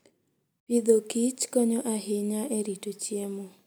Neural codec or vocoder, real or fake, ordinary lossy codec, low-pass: none; real; none; none